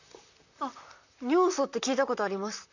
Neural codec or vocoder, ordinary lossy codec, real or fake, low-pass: vocoder, 44.1 kHz, 128 mel bands, Pupu-Vocoder; none; fake; 7.2 kHz